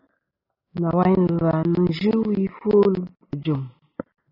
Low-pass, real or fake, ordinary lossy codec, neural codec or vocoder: 5.4 kHz; real; AAC, 24 kbps; none